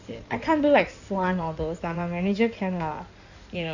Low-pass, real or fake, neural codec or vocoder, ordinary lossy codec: 7.2 kHz; fake; codec, 16 kHz in and 24 kHz out, 2.2 kbps, FireRedTTS-2 codec; none